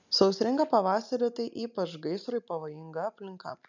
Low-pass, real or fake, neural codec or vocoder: 7.2 kHz; real; none